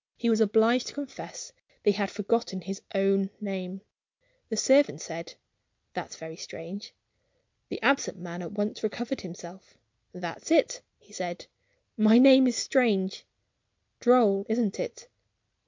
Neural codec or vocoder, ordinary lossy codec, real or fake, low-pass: none; MP3, 64 kbps; real; 7.2 kHz